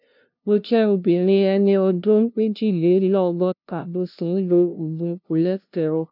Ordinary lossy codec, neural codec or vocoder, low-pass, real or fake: none; codec, 16 kHz, 0.5 kbps, FunCodec, trained on LibriTTS, 25 frames a second; 5.4 kHz; fake